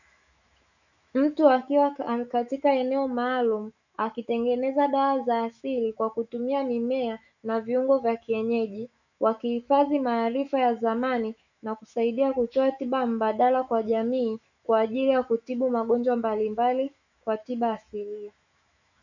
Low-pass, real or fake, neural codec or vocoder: 7.2 kHz; fake; autoencoder, 48 kHz, 128 numbers a frame, DAC-VAE, trained on Japanese speech